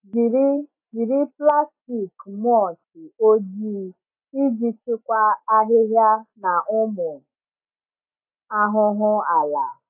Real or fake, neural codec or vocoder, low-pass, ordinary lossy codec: real; none; 3.6 kHz; AAC, 32 kbps